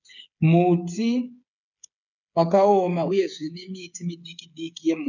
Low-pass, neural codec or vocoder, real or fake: 7.2 kHz; codec, 16 kHz, 8 kbps, FreqCodec, smaller model; fake